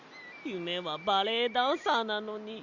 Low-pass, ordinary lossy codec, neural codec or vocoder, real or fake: 7.2 kHz; Opus, 64 kbps; none; real